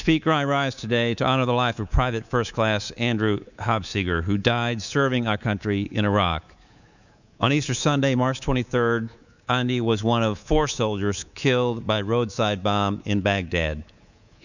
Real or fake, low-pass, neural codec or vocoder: fake; 7.2 kHz; codec, 24 kHz, 3.1 kbps, DualCodec